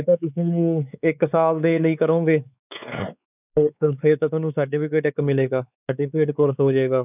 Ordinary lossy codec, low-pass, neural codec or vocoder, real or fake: none; 3.6 kHz; codec, 16 kHz, 4 kbps, X-Codec, WavLM features, trained on Multilingual LibriSpeech; fake